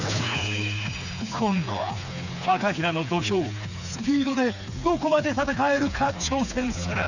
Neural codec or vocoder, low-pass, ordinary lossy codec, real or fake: codec, 16 kHz, 4 kbps, FreqCodec, smaller model; 7.2 kHz; none; fake